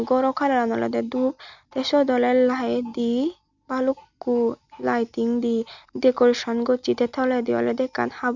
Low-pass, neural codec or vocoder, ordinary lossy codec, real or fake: 7.2 kHz; none; none; real